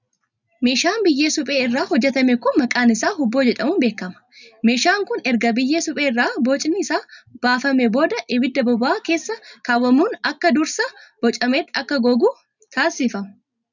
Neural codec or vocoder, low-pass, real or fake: none; 7.2 kHz; real